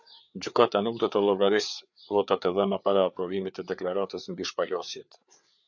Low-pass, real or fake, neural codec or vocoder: 7.2 kHz; fake; codec, 16 kHz, 4 kbps, FreqCodec, larger model